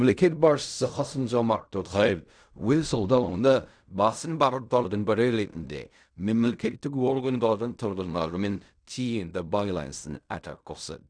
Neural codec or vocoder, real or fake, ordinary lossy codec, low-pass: codec, 16 kHz in and 24 kHz out, 0.4 kbps, LongCat-Audio-Codec, fine tuned four codebook decoder; fake; none; 9.9 kHz